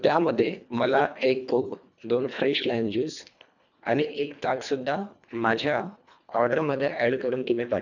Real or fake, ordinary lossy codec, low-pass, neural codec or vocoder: fake; none; 7.2 kHz; codec, 24 kHz, 1.5 kbps, HILCodec